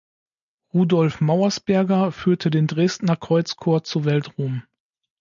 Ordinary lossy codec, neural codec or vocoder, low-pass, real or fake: MP3, 96 kbps; none; 7.2 kHz; real